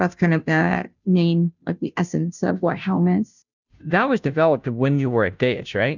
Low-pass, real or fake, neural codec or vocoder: 7.2 kHz; fake; codec, 16 kHz, 0.5 kbps, FunCodec, trained on Chinese and English, 25 frames a second